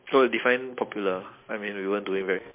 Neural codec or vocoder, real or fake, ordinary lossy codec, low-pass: none; real; MP3, 24 kbps; 3.6 kHz